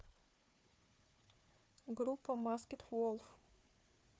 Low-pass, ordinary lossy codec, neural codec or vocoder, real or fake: none; none; codec, 16 kHz, 4 kbps, FunCodec, trained on Chinese and English, 50 frames a second; fake